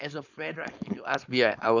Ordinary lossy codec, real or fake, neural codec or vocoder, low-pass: none; fake; codec, 16 kHz, 4.8 kbps, FACodec; 7.2 kHz